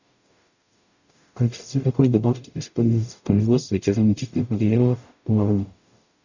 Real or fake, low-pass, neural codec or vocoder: fake; 7.2 kHz; codec, 44.1 kHz, 0.9 kbps, DAC